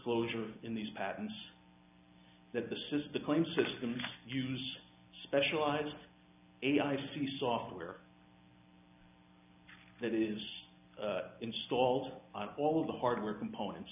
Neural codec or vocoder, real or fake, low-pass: none; real; 3.6 kHz